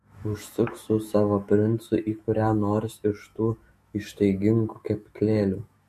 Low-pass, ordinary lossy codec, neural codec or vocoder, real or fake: 14.4 kHz; MP3, 64 kbps; autoencoder, 48 kHz, 128 numbers a frame, DAC-VAE, trained on Japanese speech; fake